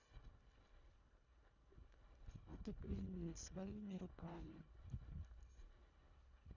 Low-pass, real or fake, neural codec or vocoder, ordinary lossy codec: 7.2 kHz; fake; codec, 24 kHz, 1.5 kbps, HILCodec; none